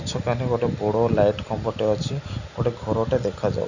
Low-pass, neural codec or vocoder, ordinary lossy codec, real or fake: 7.2 kHz; none; none; real